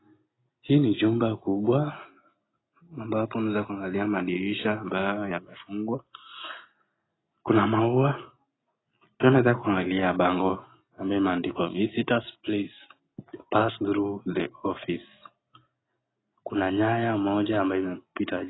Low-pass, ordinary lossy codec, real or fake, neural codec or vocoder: 7.2 kHz; AAC, 16 kbps; real; none